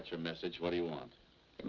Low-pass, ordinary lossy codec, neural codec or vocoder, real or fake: 7.2 kHz; Opus, 24 kbps; none; real